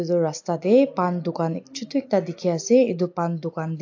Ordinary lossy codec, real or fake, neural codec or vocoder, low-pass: AAC, 48 kbps; real; none; 7.2 kHz